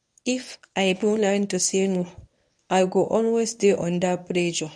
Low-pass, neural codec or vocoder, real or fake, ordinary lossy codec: 9.9 kHz; codec, 24 kHz, 0.9 kbps, WavTokenizer, medium speech release version 1; fake; none